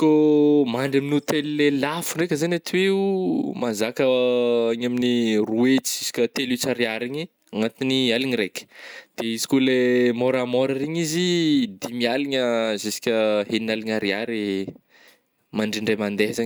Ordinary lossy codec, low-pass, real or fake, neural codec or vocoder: none; none; real; none